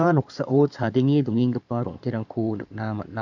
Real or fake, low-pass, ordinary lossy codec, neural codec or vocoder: fake; 7.2 kHz; none; codec, 16 kHz in and 24 kHz out, 2.2 kbps, FireRedTTS-2 codec